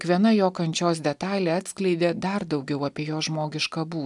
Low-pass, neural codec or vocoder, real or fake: 10.8 kHz; none; real